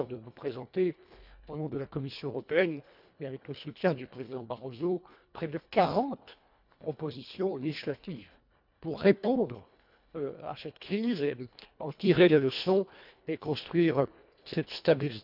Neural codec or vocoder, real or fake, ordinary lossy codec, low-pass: codec, 24 kHz, 1.5 kbps, HILCodec; fake; none; 5.4 kHz